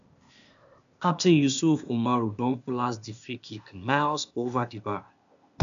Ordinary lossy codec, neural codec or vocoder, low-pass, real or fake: none; codec, 16 kHz, 0.8 kbps, ZipCodec; 7.2 kHz; fake